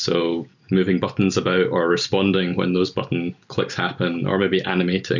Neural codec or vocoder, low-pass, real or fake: none; 7.2 kHz; real